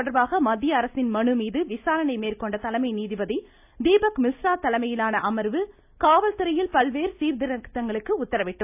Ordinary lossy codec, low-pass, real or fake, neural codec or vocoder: AAC, 32 kbps; 3.6 kHz; real; none